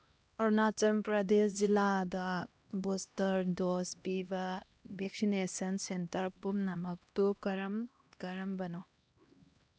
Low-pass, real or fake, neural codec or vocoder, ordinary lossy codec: none; fake; codec, 16 kHz, 1 kbps, X-Codec, HuBERT features, trained on LibriSpeech; none